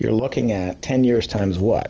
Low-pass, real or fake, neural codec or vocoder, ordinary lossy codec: 7.2 kHz; real; none; Opus, 24 kbps